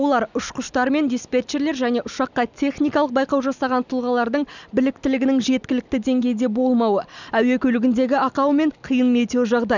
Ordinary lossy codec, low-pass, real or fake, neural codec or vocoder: none; 7.2 kHz; real; none